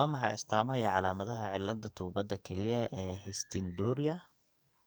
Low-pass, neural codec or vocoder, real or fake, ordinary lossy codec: none; codec, 44.1 kHz, 2.6 kbps, SNAC; fake; none